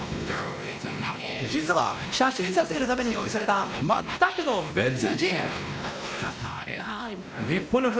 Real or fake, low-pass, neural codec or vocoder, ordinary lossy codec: fake; none; codec, 16 kHz, 1 kbps, X-Codec, WavLM features, trained on Multilingual LibriSpeech; none